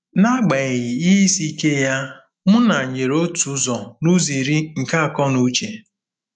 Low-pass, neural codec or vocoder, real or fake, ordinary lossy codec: 9.9 kHz; autoencoder, 48 kHz, 128 numbers a frame, DAC-VAE, trained on Japanese speech; fake; none